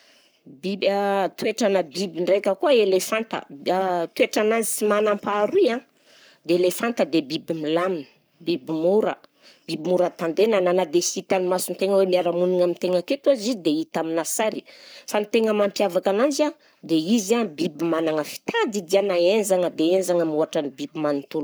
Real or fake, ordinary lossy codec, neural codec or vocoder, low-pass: fake; none; codec, 44.1 kHz, 7.8 kbps, Pupu-Codec; none